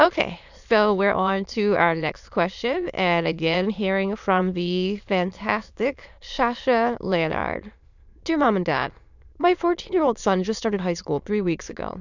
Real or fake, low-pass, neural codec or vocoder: fake; 7.2 kHz; autoencoder, 22.05 kHz, a latent of 192 numbers a frame, VITS, trained on many speakers